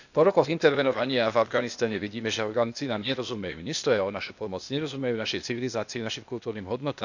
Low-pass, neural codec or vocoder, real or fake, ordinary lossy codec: 7.2 kHz; codec, 16 kHz, 0.8 kbps, ZipCodec; fake; none